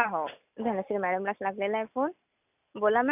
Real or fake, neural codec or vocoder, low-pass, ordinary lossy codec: real; none; 3.6 kHz; none